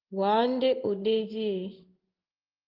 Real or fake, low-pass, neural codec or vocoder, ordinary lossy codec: fake; 5.4 kHz; codec, 16 kHz in and 24 kHz out, 1 kbps, XY-Tokenizer; Opus, 32 kbps